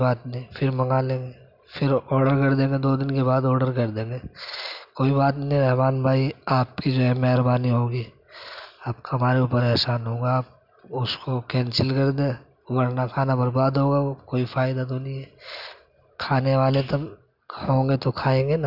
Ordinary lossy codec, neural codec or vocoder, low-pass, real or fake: none; none; 5.4 kHz; real